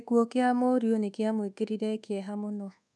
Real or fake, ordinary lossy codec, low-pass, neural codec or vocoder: fake; none; none; codec, 24 kHz, 1.2 kbps, DualCodec